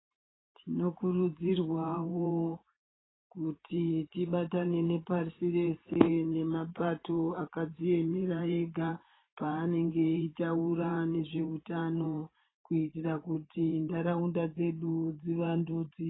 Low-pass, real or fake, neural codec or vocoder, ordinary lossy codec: 7.2 kHz; fake; vocoder, 44.1 kHz, 128 mel bands every 512 samples, BigVGAN v2; AAC, 16 kbps